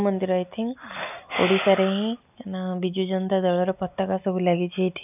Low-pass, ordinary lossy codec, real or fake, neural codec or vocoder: 3.6 kHz; none; real; none